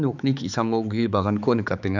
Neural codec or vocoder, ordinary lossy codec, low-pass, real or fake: codec, 16 kHz, 4 kbps, X-Codec, HuBERT features, trained on balanced general audio; none; 7.2 kHz; fake